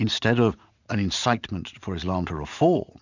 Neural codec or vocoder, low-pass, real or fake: vocoder, 44.1 kHz, 80 mel bands, Vocos; 7.2 kHz; fake